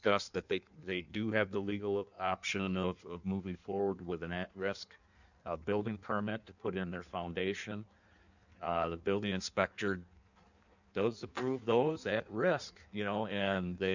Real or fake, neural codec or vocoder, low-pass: fake; codec, 16 kHz in and 24 kHz out, 1.1 kbps, FireRedTTS-2 codec; 7.2 kHz